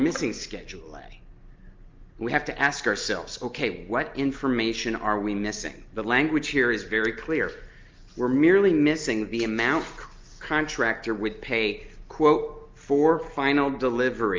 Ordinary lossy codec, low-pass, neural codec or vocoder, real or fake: Opus, 24 kbps; 7.2 kHz; none; real